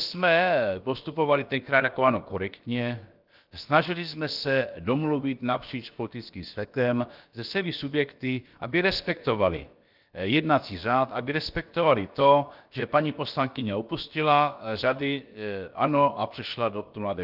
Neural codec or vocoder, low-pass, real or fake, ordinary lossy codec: codec, 16 kHz, about 1 kbps, DyCAST, with the encoder's durations; 5.4 kHz; fake; Opus, 32 kbps